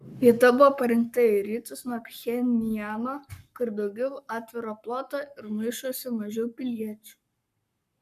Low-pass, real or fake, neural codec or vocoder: 14.4 kHz; fake; codec, 44.1 kHz, 7.8 kbps, Pupu-Codec